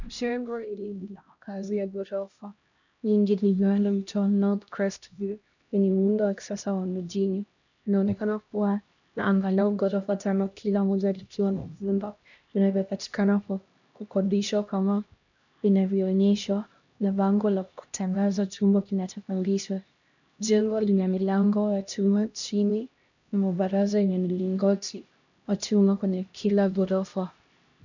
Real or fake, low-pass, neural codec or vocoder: fake; 7.2 kHz; codec, 16 kHz, 1 kbps, X-Codec, HuBERT features, trained on LibriSpeech